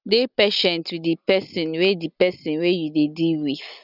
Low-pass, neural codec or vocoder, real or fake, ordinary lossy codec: 5.4 kHz; none; real; none